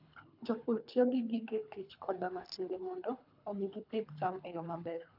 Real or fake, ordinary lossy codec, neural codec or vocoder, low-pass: fake; none; codec, 24 kHz, 3 kbps, HILCodec; 5.4 kHz